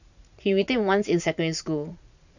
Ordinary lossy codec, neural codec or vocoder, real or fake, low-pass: none; vocoder, 44.1 kHz, 80 mel bands, Vocos; fake; 7.2 kHz